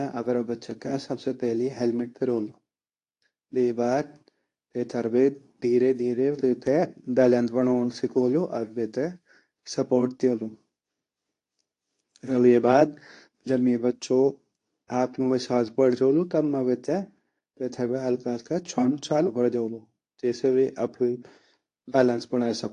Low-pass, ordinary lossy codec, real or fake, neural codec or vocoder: 10.8 kHz; AAC, 48 kbps; fake; codec, 24 kHz, 0.9 kbps, WavTokenizer, medium speech release version 2